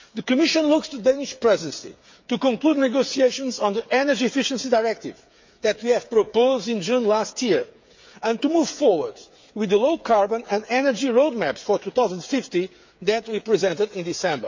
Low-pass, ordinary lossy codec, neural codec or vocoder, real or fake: 7.2 kHz; MP3, 48 kbps; codec, 16 kHz, 8 kbps, FreqCodec, smaller model; fake